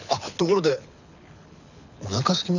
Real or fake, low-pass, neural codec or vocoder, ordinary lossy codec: fake; 7.2 kHz; codec, 24 kHz, 6 kbps, HILCodec; none